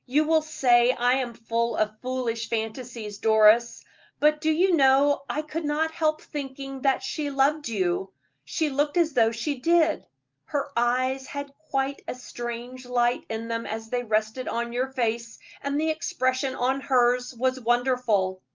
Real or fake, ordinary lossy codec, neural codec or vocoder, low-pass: real; Opus, 24 kbps; none; 7.2 kHz